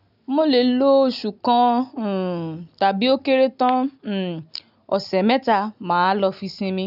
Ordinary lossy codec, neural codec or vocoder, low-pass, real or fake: none; none; 5.4 kHz; real